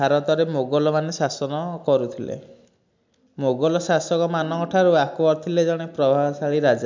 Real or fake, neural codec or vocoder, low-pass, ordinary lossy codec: real; none; 7.2 kHz; MP3, 64 kbps